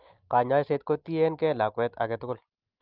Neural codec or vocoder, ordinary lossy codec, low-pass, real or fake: none; Opus, 32 kbps; 5.4 kHz; real